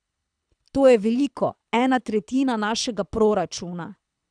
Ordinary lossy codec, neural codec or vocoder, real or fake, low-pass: none; codec, 24 kHz, 6 kbps, HILCodec; fake; 9.9 kHz